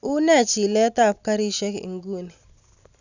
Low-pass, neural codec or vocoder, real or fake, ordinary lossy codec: 7.2 kHz; none; real; none